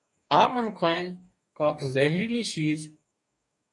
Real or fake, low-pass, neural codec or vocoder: fake; 10.8 kHz; codec, 44.1 kHz, 2.6 kbps, DAC